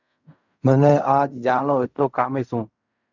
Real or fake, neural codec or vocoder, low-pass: fake; codec, 16 kHz in and 24 kHz out, 0.4 kbps, LongCat-Audio-Codec, fine tuned four codebook decoder; 7.2 kHz